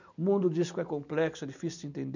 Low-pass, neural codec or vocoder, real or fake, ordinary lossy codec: 7.2 kHz; none; real; none